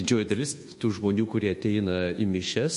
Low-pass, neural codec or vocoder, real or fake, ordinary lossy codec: 10.8 kHz; codec, 24 kHz, 1.2 kbps, DualCodec; fake; MP3, 48 kbps